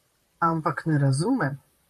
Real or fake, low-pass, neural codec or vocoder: fake; 14.4 kHz; vocoder, 44.1 kHz, 128 mel bands, Pupu-Vocoder